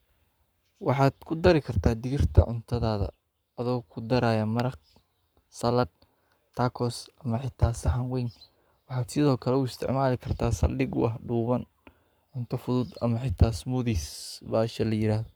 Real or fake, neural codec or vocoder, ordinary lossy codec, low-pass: fake; codec, 44.1 kHz, 7.8 kbps, Pupu-Codec; none; none